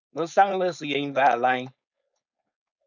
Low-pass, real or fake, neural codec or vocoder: 7.2 kHz; fake; codec, 16 kHz, 4.8 kbps, FACodec